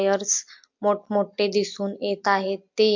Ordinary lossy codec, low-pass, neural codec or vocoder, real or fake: MP3, 48 kbps; 7.2 kHz; none; real